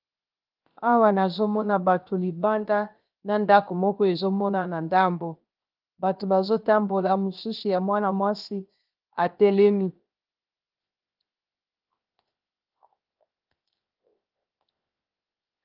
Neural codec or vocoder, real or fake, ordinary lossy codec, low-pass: codec, 16 kHz, 0.7 kbps, FocalCodec; fake; Opus, 32 kbps; 5.4 kHz